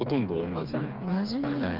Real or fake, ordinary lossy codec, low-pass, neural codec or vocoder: fake; Opus, 24 kbps; 5.4 kHz; codec, 16 kHz, 4 kbps, FreqCodec, smaller model